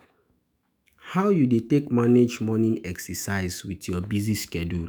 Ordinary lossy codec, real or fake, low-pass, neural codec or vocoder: none; fake; none; autoencoder, 48 kHz, 128 numbers a frame, DAC-VAE, trained on Japanese speech